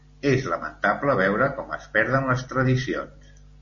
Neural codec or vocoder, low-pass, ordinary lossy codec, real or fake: none; 7.2 kHz; MP3, 32 kbps; real